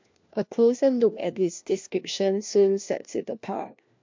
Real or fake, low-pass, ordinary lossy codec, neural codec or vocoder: fake; 7.2 kHz; MP3, 48 kbps; codec, 16 kHz, 1 kbps, FunCodec, trained on LibriTTS, 50 frames a second